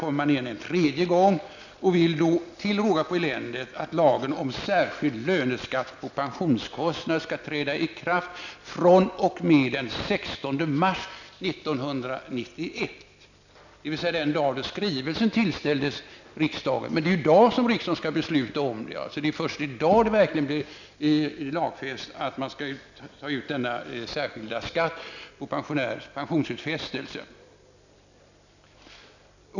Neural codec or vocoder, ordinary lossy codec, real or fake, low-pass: none; none; real; 7.2 kHz